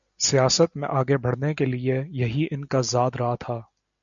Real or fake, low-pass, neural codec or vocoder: real; 7.2 kHz; none